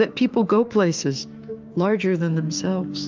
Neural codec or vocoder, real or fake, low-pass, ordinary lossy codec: autoencoder, 48 kHz, 32 numbers a frame, DAC-VAE, trained on Japanese speech; fake; 7.2 kHz; Opus, 24 kbps